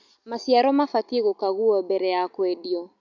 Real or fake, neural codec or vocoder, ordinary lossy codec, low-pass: real; none; none; 7.2 kHz